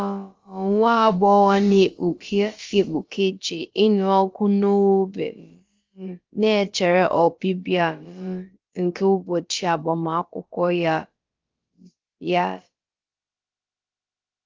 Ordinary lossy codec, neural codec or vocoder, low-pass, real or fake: Opus, 32 kbps; codec, 16 kHz, about 1 kbps, DyCAST, with the encoder's durations; 7.2 kHz; fake